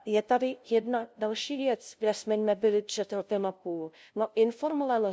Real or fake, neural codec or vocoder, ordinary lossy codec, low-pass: fake; codec, 16 kHz, 0.5 kbps, FunCodec, trained on LibriTTS, 25 frames a second; none; none